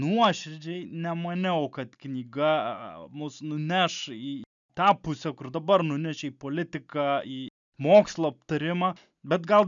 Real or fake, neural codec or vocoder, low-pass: real; none; 7.2 kHz